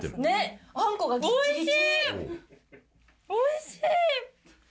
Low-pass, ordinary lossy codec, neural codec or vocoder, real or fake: none; none; none; real